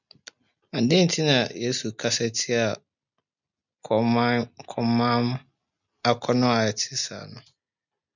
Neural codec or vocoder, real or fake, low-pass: none; real; 7.2 kHz